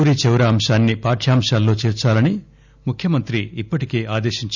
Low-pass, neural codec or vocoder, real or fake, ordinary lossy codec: 7.2 kHz; none; real; none